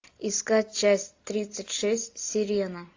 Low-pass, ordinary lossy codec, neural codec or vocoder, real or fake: 7.2 kHz; AAC, 48 kbps; none; real